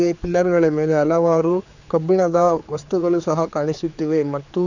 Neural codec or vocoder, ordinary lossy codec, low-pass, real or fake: codec, 16 kHz, 4 kbps, X-Codec, HuBERT features, trained on general audio; none; 7.2 kHz; fake